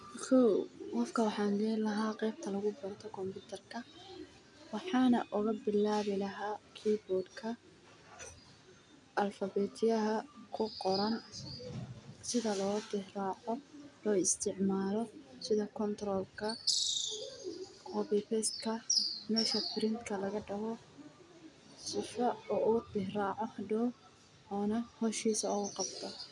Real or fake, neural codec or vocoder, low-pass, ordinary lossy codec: real; none; 10.8 kHz; MP3, 96 kbps